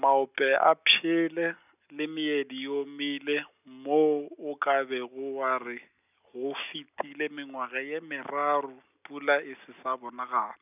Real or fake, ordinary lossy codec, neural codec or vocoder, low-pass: real; none; none; 3.6 kHz